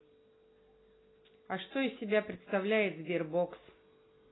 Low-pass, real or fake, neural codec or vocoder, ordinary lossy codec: 7.2 kHz; real; none; AAC, 16 kbps